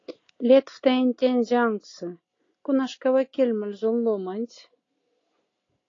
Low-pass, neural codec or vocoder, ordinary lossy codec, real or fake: 7.2 kHz; none; AAC, 32 kbps; real